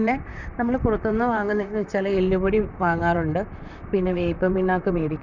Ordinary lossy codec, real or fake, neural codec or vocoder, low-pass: none; fake; vocoder, 44.1 kHz, 128 mel bands, Pupu-Vocoder; 7.2 kHz